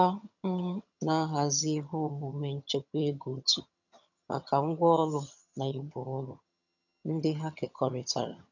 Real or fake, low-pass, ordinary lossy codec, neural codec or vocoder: fake; 7.2 kHz; none; vocoder, 22.05 kHz, 80 mel bands, HiFi-GAN